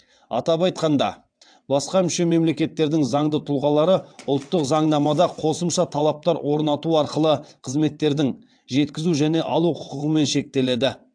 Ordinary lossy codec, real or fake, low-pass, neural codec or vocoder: AAC, 64 kbps; fake; 9.9 kHz; vocoder, 22.05 kHz, 80 mel bands, WaveNeXt